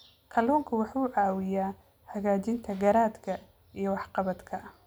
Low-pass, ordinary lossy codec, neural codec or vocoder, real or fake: none; none; none; real